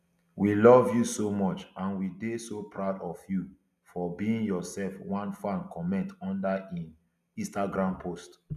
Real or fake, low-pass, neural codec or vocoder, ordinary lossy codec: real; 14.4 kHz; none; none